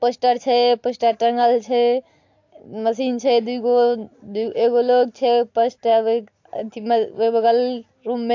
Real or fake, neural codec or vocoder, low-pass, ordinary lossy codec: real; none; 7.2 kHz; AAC, 48 kbps